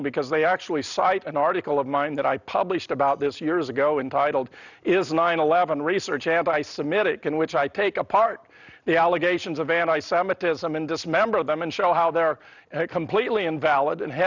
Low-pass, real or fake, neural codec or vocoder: 7.2 kHz; real; none